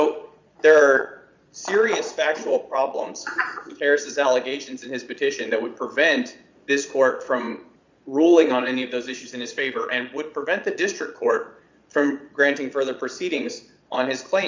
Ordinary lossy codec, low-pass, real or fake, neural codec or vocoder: MP3, 64 kbps; 7.2 kHz; fake; vocoder, 22.05 kHz, 80 mel bands, Vocos